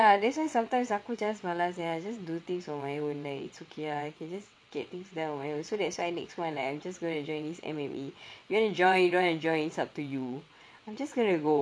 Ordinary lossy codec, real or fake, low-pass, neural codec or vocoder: none; fake; none; vocoder, 22.05 kHz, 80 mel bands, WaveNeXt